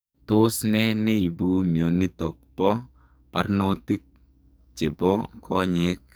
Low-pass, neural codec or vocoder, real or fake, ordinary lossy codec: none; codec, 44.1 kHz, 2.6 kbps, SNAC; fake; none